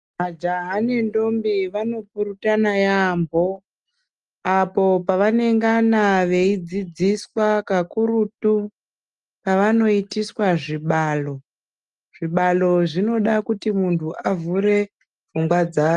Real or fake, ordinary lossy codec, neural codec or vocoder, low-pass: real; Opus, 32 kbps; none; 10.8 kHz